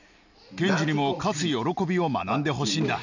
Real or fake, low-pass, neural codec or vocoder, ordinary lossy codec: real; 7.2 kHz; none; none